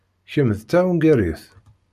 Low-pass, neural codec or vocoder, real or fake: 14.4 kHz; none; real